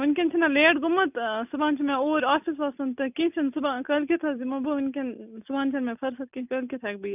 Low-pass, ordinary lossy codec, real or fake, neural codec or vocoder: 3.6 kHz; none; real; none